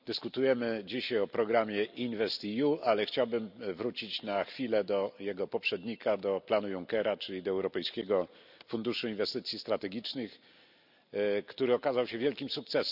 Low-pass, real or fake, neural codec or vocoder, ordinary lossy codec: 5.4 kHz; real; none; none